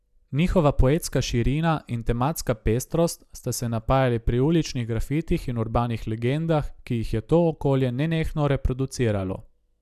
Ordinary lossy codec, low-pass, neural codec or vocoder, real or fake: none; 14.4 kHz; none; real